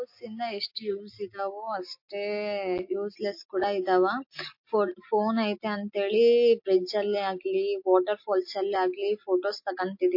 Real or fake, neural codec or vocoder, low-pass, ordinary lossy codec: real; none; 5.4 kHz; MP3, 32 kbps